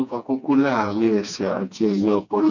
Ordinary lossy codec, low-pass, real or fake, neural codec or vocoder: none; 7.2 kHz; fake; codec, 16 kHz, 2 kbps, FreqCodec, smaller model